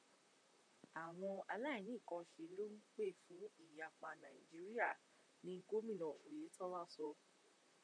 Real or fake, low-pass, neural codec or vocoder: fake; 9.9 kHz; vocoder, 44.1 kHz, 128 mel bands, Pupu-Vocoder